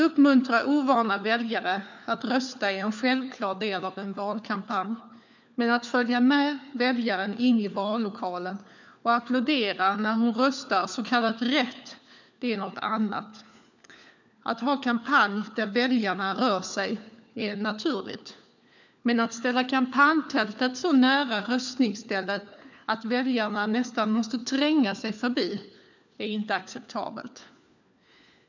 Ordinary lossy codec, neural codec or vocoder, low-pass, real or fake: none; codec, 16 kHz, 4 kbps, FunCodec, trained on LibriTTS, 50 frames a second; 7.2 kHz; fake